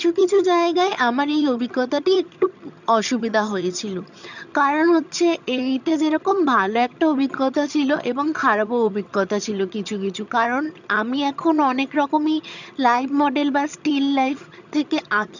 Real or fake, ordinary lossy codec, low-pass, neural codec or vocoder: fake; none; 7.2 kHz; vocoder, 22.05 kHz, 80 mel bands, HiFi-GAN